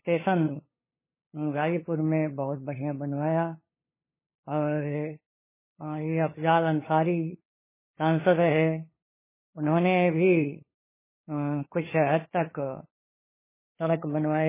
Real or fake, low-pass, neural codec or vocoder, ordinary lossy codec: fake; 3.6 kHz; codec, 16 kHz, 8 kbps, FunCodec, trained on LibriTTS, 25 frames a second; MP3, 16 kbps